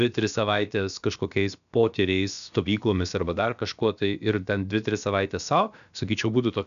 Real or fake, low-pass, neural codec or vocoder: fake; 7.2 kHz; codec, 16 kHz, about 1 kbps, DyCAST, with the encoder's durations